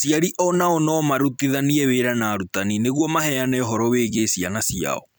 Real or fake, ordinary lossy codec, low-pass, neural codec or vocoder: fake; none; none; vocoder, 44.1 kHz, 128 mel bands every 256 samples, BigVGAN v2